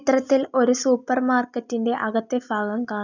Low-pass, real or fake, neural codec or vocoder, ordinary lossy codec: 7.2 kHz; real; none; none